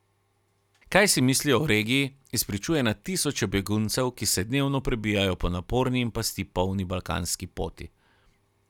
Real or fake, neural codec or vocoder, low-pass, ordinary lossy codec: real; none; 19.8 kHz; none